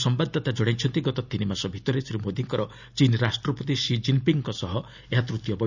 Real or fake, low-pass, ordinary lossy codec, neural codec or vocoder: real; 7.2 kHz; none; none